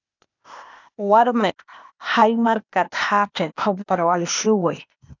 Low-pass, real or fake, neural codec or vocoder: 7.2 kHz; fake; codec, 16 kHz, 0.8 kbps, ZipCodec